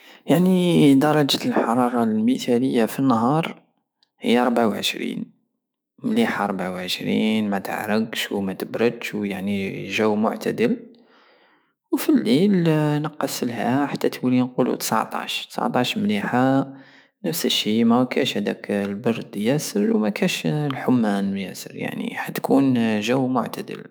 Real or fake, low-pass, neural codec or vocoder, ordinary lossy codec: fake; none; autoencoder, 48 kHz, 128 numbers a frame, DAC-VAE, trained on Japanese speech; none